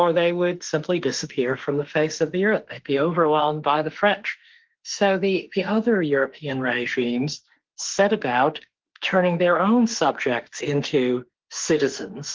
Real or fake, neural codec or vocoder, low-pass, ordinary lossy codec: fake; autoencoder, 48 kHz, 32 numbers a frame, DAC-VAE, trained on Japanese speech; 7.2 kHz; Opus, 16 kbps